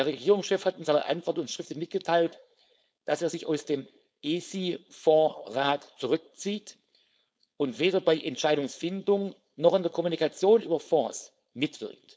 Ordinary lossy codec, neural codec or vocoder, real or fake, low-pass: none; codec, 16 kHz, 4.8 kbps, FACodec; fake; none